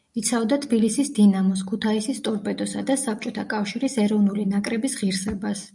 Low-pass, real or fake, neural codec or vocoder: 10.8 kHz; real; none